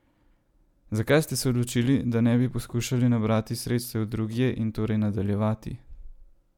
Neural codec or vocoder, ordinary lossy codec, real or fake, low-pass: none; MP3, 96 kbps; real; 19.8 kHz